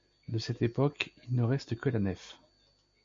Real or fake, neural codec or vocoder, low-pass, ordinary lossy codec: real; none; 7.2 kHz; MP3, 48 kbps